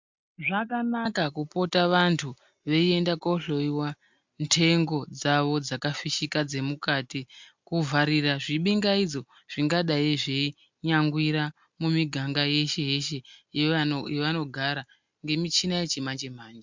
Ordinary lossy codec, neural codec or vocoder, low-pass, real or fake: MP3, 64 kbps; none; 7.2 kHz; real